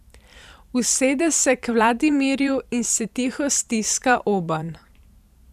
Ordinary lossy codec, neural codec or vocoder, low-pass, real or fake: none; vocoder, 44.1 kHz, 128 mel bands every 512 samples, BigVGAN v2; 14.4 kHz; fake